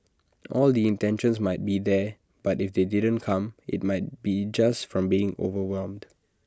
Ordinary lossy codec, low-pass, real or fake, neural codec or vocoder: none; none; real; none